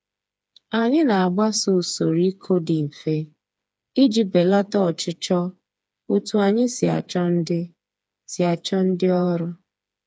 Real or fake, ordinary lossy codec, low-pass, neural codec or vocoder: fake; none; none; codec, 16 kHz, 4 kbps, FreqCodec, smaller model